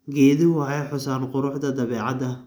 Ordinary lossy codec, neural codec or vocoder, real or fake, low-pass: none; none; real; none